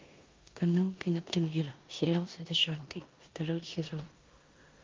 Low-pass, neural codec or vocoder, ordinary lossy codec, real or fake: 7.2 kHz; codec, 16 kHz in and 24 kHz out, 0.9 kbps, LongCat-Audio-Codec, four codebook decoder; Opus, 32 kbps; fake